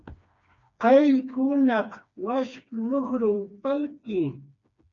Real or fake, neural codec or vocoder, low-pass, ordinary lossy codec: fake; codec, 16 kHz, 2 kbps, FreqCodec, smaller model; 7.2 kHz; MP3, 64 kbps